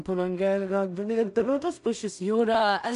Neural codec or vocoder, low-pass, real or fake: codec, 16 kHz in and 24 kHz out, 0.4 kbps, LongCat-Audio-Codec, two codebook decoder; 10.8 kHz; fake